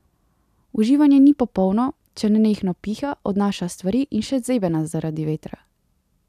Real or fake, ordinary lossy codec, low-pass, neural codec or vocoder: real; none; 14.4 kHz; none